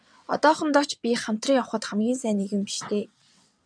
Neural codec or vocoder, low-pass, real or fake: vocoder, 22.05 kHz, 80 mel bands, WaveNeXt; 9.9 kHz; fake